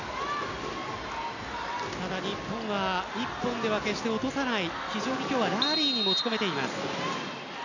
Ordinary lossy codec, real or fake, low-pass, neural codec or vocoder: none; real; 7.2 kHz; none